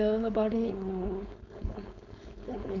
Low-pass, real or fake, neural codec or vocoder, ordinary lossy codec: 7.2 kHz; fake; codec, 16 kHz, 4.8 kbps, FACodec; none